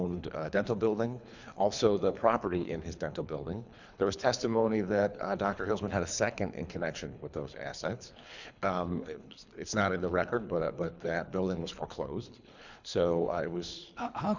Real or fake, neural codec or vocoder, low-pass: fake; codec, 24 kHz, 3 kbps, HILCodec; 7.2 kHz